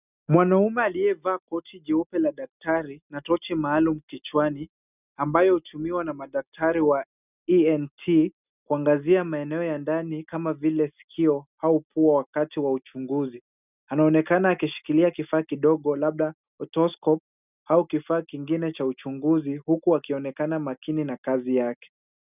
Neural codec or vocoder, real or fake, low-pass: none; real; 3.6 kHz